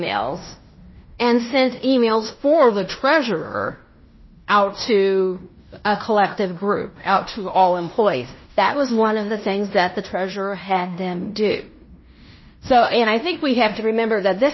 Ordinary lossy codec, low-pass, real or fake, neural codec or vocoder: MP3, 24 kbps; 7.2 kHz; fake; codec, 16 kHz in and 24 kHz out, 0.9 kbps, LongCat-Audio-Codec, fine tuned four codebook decoder